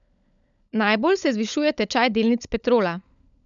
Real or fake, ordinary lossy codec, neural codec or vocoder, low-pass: fake; MP3, 96 kbps; codec, 16 kHz, 16 kbps, FunCodec, trained on LibriTTS, 50 frames a second; 7.2 kHz